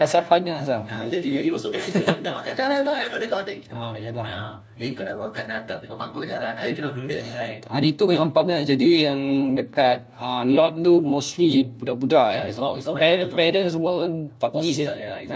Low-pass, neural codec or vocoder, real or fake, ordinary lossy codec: none; codec, 16 kHz, 1 kbps, FunCodec, trained on LibriTTS, 50 frames a second; fake; none